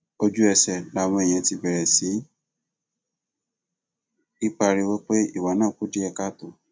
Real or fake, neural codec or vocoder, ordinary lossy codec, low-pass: real; none; none; none